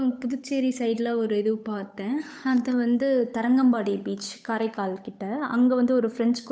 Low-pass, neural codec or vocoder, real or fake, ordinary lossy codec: none; codec, 16 kHz, 8 kbps, FunCodec, trained on Chinese and English, 25 frames a second; fake; none